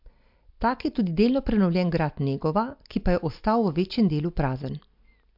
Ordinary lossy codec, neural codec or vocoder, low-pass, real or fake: MP3, 48 kbps; none; 5.4 kHz; real